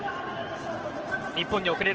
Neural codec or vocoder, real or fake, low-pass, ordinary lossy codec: none; real; 7.2 kHz; Opus, 16 kbps